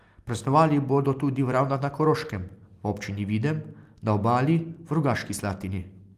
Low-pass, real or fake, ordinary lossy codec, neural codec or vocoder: 14.4 kHz; real; Opus, 24 kbps; none